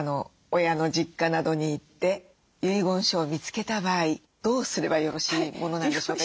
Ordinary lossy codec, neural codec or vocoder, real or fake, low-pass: none; none; real; none